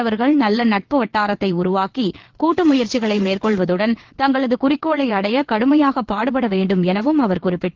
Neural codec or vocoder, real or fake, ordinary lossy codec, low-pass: vocoder, 22.05 kHz, 80 mel bands, WaveNeXt; fake; Opus, 16 kbps; 7.2 kHz